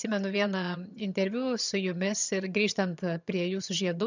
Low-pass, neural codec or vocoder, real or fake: 7.2 kHz; vocoder, 22.05 kHz, 80 mel bands, HiFi-GAN; fake